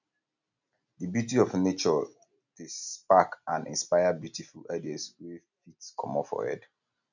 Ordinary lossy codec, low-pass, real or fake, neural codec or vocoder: none; 7.2 kHz; real; none